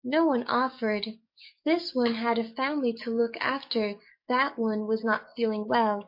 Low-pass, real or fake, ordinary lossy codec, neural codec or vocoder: 5.4 kHz; fake; MP3, 32 kbps; codec, 44.1 kHz, 7.8 kbps, DAC